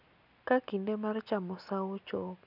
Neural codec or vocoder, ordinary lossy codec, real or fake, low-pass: none; none; real; 5.4 kHz